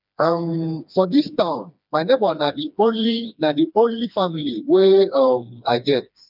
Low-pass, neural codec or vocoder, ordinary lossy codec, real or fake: 5.4 kHz; codec, 16 kHz, 2 kbps, FreqCodec, smaller model; none; fake